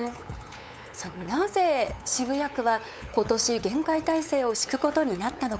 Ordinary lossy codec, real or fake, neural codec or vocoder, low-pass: none; fake; codec, 16 kHz, 8 kbps, FunCodec, trained on LibriTTS, 25 frames a second; none